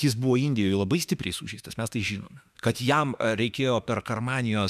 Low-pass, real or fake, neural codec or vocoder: 14.4 kHz; fake; autoencoder, 48 kHz, 32 numbers a frame, DAC-VAE, trained on Japanese speech